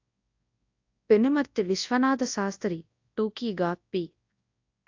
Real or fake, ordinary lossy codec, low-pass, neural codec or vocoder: fake; AAC, 48 kbps; 7.2 kHz; codec, 24 kHz, 0.9 kbps, WavTokenizer, large speech release